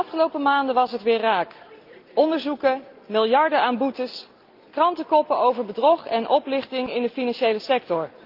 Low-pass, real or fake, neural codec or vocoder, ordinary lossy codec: 5.4 kHz; real; none; Opus, 32 kbps